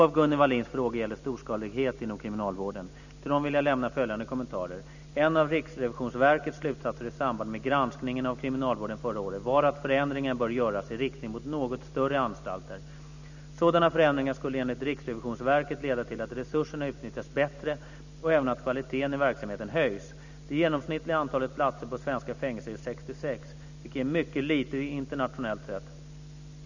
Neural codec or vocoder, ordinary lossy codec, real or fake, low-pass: none; none; real; 7.2 kHz